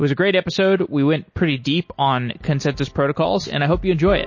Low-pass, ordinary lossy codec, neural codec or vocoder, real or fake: 7.2 kHz; MP3, 32 kbps; none; real